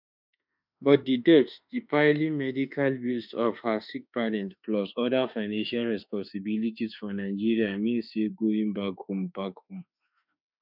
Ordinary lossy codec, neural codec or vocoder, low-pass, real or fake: none; autoencoder, 48 kHz, 32 numbers a frame, DAC-VAE, trained on Japanese speech; 5.4 kHz; fake